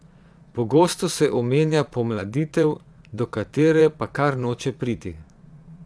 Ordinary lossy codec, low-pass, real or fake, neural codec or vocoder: none; none; fake; vocoder, 22.05 kHz, 80 mel bands, Vocos